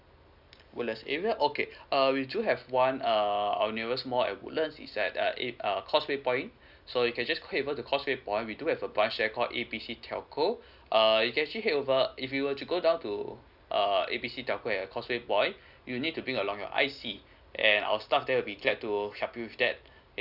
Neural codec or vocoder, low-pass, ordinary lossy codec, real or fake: none; 5.4 kHz; none; real